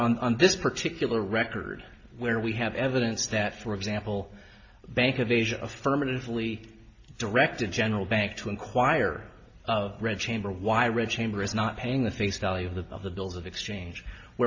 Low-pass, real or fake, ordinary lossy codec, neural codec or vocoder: 7.2 kHz; real; AAC, 48 kbps; none